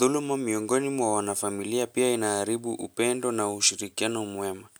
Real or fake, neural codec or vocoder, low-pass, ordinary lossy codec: real; none; none; none